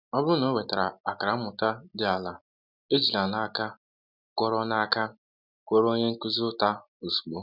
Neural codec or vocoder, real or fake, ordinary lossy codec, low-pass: none; real; none; 5.4 kHz